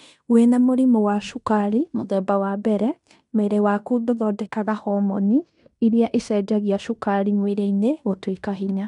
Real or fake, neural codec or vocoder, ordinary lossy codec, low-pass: fake; codec, 16 kHz in and 24 kHz out, 0.9 kbps, LongCat-Audio-Codec, fine tuned four codebook decoder; none; 10.8 kHz